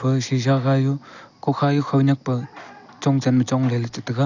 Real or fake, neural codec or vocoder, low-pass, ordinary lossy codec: real; none; 7.2 kHz; none